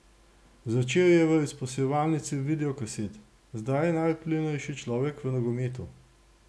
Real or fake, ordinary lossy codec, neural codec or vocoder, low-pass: real; none; none; none